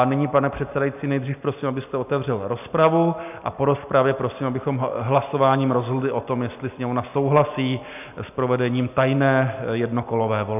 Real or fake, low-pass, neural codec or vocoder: real; 3.6 kHz; none